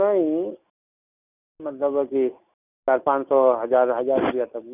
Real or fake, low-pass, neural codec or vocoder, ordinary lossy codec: real; 3.6 kHz; none; none